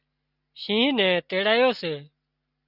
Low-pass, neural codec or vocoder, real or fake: 5.4 kHz; none; real